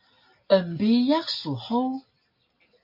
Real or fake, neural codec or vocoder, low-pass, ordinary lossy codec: real; none; 5.4 kHz; MP3, 32 kbps